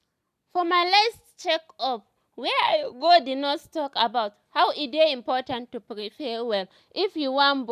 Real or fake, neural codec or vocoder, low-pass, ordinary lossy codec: real; none; 14.4 kHz; none